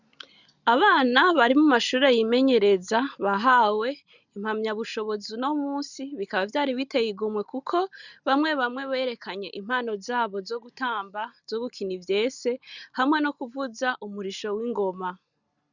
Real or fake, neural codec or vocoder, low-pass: real; none; 7.2 kHz